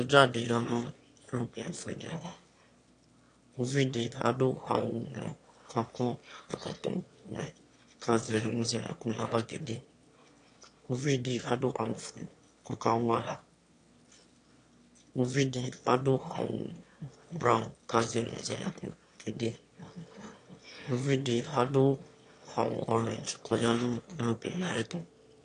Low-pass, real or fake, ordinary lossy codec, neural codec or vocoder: 9.9 kHz; fake; AAC, 48 kbps; autoencoder, 22.05 kHz, a latent of 192 numbers a frame, VITS, trained on one speaker